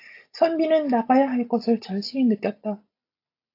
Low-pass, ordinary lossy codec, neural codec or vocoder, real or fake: 5.4 kHz; AAC, 32 kbps; none; real